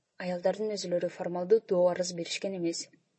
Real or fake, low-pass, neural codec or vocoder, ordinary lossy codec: real; 9.9 kHz; none; MP3, 32 kbps